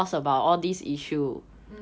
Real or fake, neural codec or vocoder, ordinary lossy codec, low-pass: real; none; none; none